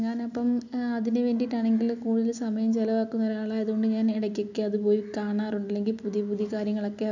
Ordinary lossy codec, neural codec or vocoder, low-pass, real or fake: none; none; 7.2 kHz; real